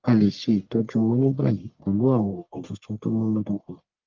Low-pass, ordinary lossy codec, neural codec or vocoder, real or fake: 7.2 kHz; Opus, 32 kbps; codec, 44.1 kHz, 1.7 kbps, Pupu-Codec; fake